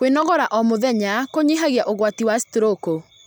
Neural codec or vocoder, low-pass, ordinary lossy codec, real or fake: none; none; none; real